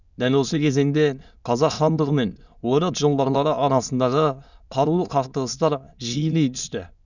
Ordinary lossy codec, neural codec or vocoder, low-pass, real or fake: none; autoencoder, 22.05 kHz, a latent of 192 numbers a frame, VITS, trained on many speakers; 7.2 kHz; fake